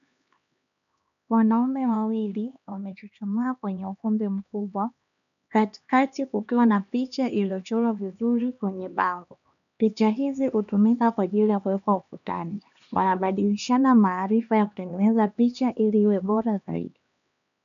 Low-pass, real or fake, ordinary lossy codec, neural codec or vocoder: 7.2 kHz; fake; MP3, 96 kbps; codec, 16 kHz, 2 kbps, X-Codec, HuBERT features, trained on LibriSpeech